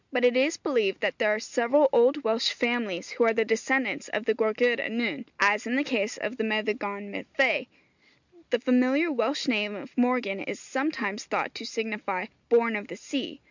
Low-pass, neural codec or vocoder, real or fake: 7.2 kHz; none; real